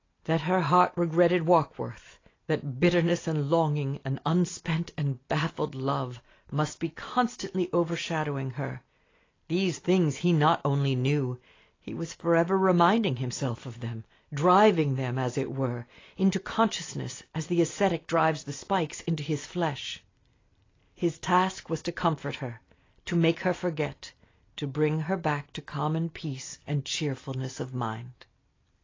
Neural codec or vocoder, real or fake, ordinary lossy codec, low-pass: none; real; AAC, 32 kbps; 7.2 kHz